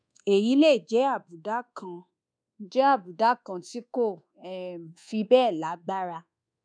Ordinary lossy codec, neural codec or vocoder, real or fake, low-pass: none; codec, 24 kHz, 1.2 kbps, DualCodec; fake; 9.9 kHz